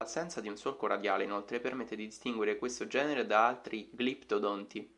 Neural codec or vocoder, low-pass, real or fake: none; 10.8 kHz; real